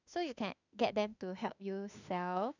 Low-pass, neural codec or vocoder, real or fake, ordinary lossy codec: 7.2 kHz; autoencoder, 48 kHz, 32 numbers a frame, DAC-VAE, trained on Japanese speech; fake; none